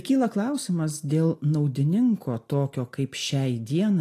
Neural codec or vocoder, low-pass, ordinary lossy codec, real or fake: none; 14.4 kHz; AAC, 48 kbps; real